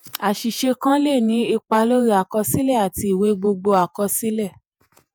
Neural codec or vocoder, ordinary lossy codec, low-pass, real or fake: vocoder, 48 kHz, 128 mel bands, Vocos; none; none; fake